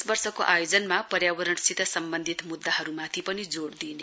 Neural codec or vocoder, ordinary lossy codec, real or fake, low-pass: none; none; real; none